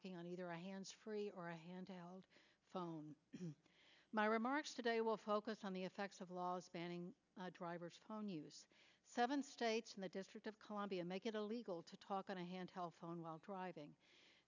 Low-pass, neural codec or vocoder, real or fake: 7.2 kHz; none; real